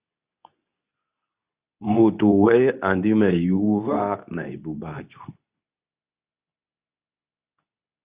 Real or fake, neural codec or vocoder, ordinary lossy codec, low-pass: fake; codec, 24 kHz, 0.9 kbps, WavTokenizer, medium speech release version 2; Opus, 64 kbps; 3.6 kHz